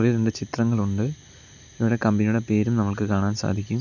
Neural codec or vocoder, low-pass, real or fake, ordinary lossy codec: none; 7.2 kHz; real; none